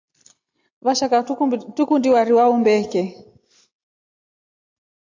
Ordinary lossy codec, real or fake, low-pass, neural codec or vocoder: AAC, 48 kbps; real; 7.2 kHz; none